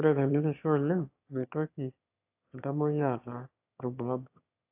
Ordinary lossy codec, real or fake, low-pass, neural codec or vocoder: AAC, 32 kbps; fake; 3.6 kHz; autoencoder, 22.05 kHz, a latent of 192 numbers a frame, VITS, trained on one speaker